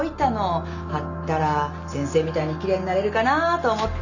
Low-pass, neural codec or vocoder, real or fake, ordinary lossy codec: 7.2 kHz; none; real; none